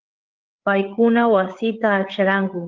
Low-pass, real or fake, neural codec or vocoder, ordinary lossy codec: 7.2 kHz; fake; codec, 16 kHz, 8 kbps, FreqCodec, larger model; Opus, 16 kbps